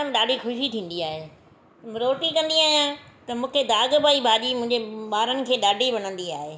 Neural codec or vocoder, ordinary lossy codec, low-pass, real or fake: none; none; none; real